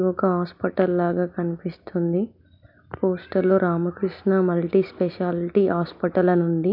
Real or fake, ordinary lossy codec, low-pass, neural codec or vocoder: real; MP3, 48 kbps; 5.4 kHz; none